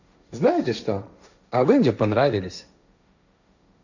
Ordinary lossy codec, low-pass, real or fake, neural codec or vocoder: none; 7.2 kHz; fake; codec, 16 kHz, 1.1 kbps, Voila-Tokenizer